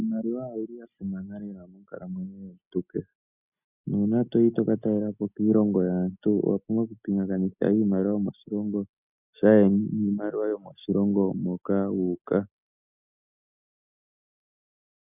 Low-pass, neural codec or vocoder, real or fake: 3.6 kHz; none; real